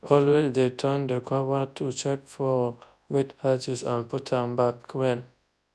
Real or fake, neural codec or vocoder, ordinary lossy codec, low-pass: fake; codec, 24 kHz, 0.9 kbps, WavTokenizer, large speech release; none; none